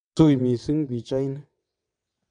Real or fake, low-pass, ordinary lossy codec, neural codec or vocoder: fake; 9.9 kHz; none; vocoder, 22.05 kHz, 80 mel bands, WaveNeXt